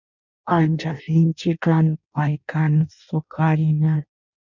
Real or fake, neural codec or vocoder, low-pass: fake; codec, 16 kHz in and 24 kHz out, 0.6 kbps, FireRedTTS-2 codec; 7.2 kHz